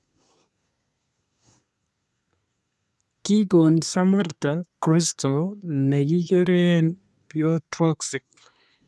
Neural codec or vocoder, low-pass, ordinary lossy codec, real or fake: codec, 24 kHz, 1 kbps, SNAC; none; none; fake